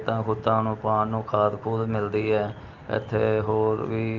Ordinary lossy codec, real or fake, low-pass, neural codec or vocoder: Opus, 32 kbps; real; 7.2 kHz; none